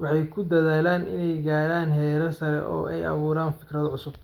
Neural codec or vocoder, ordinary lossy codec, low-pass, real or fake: none; Opus, 32 kbps; 19.8 kHz; real